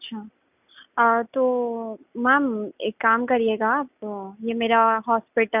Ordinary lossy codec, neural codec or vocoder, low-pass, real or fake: none; none; 3.6 kHz; real